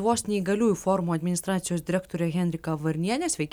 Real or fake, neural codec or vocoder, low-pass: real; none; 19.8 kHz